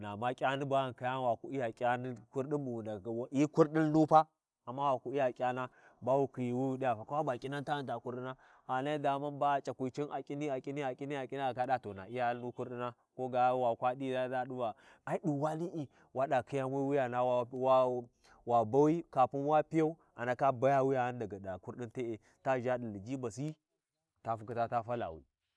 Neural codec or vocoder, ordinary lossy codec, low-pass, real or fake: none; none; none; real